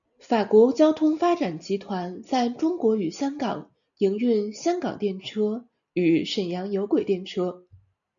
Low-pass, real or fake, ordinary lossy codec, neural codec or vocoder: 7.2 kHz; real; MP3, 96 kbps; none